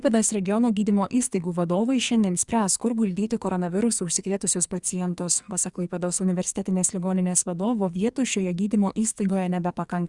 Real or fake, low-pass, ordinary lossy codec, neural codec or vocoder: fake; 10.8 kHz; Opus, 64 kbps; codec, 44.1 kHz, 2.6 kbps, SNAC